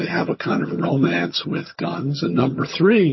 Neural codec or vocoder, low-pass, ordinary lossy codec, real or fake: vocoder, 22.05 kHz, 80 mel bands, HiFi-GAN; 7.2 kHz; MP3, 24 kbps; fake